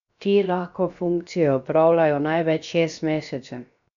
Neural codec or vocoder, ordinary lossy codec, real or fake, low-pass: codec, 16 kHz, 0.7 kbps, FocalCodec; none; fake; 7.2 kHz